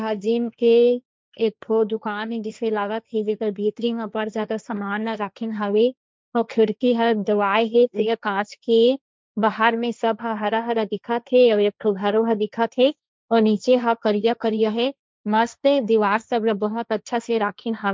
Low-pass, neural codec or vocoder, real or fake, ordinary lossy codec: none; codec, 16 kHz, 1.1 kbps, Voila-Tokenizer; fake; none